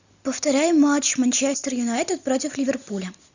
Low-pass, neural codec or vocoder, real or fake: 7.2 kHz; none; real